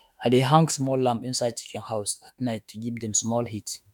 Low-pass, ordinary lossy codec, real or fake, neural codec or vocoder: 19.8 kHz; none; fake; autoencoder, 48 kHz, 32 numbers a frame, DAC-VAE, trained on Japanese speech